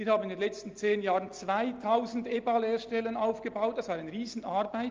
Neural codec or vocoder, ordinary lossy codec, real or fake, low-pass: none; Opus, 32 kbps; real; 7.2 kHz